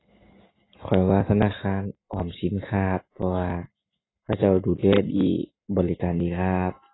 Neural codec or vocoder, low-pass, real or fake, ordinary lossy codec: none; 7.2 kHz; real; AAC, 16 kbps